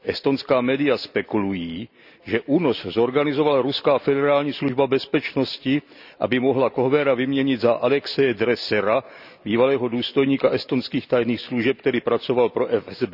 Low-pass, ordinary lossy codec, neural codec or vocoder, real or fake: 5.4 kHz; none; none; real